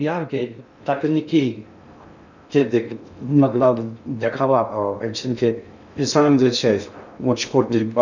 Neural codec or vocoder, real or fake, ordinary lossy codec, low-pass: codec, 16 kHz in and 24 kHz out, 0.6 kbps, FocalCodec, streaming, 2048 codes; fake; none; 7.2 kHz